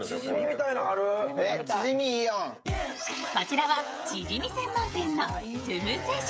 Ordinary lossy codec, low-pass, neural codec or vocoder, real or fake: none; none; codec, 16 kHz, 16 kbps, FreqCodec, smaller model; fake